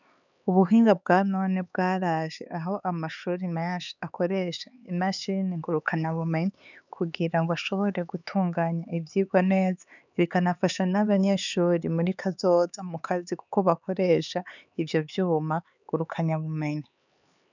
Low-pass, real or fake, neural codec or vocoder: 7.2 kHz; fake; codec, 16 kHz, 4 kbps, X-Codec, HuBERT features, trained on LibriSpeech